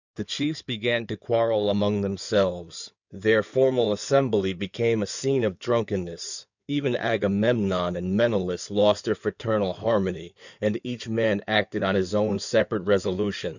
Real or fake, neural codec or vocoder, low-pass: fake; codec, 16 kHz in and 24 kHz out, 2.2 kbps, FireRedTTS-2 codec; 7.2 kHz